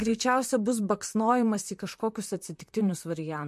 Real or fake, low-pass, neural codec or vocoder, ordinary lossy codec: fake; 14.4 kHz; vocoder, 44.1 kHz, 128 mel bands, Pupu-Vocoder; MP3, 64 kbps